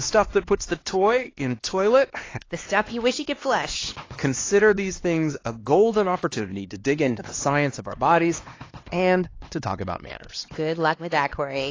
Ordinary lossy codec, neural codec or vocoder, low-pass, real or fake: AAC, 32 kbps; codec, 16 kHz, 2 kbps, X-Codec, HuBERT features, trained on LibriSpeech; 7.2 kHz; fake